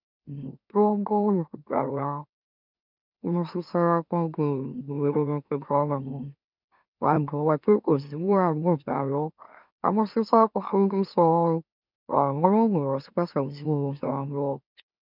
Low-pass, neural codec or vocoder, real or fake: 5.4 kHz; autoencoder, 44.1 kHz, a latent of 192 numbers a frame, MeloTTS; fake